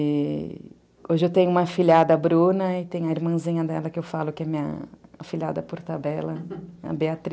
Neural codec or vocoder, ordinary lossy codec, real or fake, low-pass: none; none; real; none